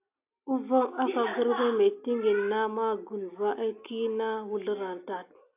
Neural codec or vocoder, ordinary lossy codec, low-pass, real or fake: none; AAC, 32 kbps; 3.6 kHz; real